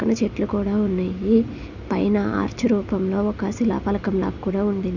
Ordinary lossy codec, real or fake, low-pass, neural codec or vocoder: none; real; 7.2 kHz; none